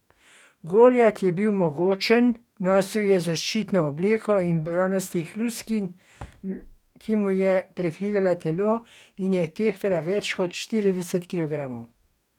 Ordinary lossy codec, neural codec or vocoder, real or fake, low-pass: none; codec, 44.1 kHz, 2.6 kbps, DAC; fake; 19.8 kHz